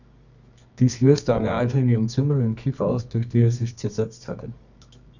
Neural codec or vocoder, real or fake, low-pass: codec, 24 kHz, 0.9 kbps, WavTokenizer, medium music audio release; fake; 7.2 kHz